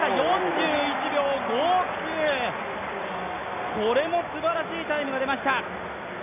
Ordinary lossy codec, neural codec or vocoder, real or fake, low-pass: none; none; real; 3.6 kHz